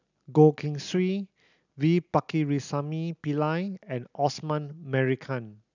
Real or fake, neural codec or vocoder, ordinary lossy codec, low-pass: real; none; none; 7.2 kHz